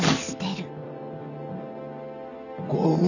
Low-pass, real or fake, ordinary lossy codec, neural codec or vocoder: 7.2 kHz; real; none; none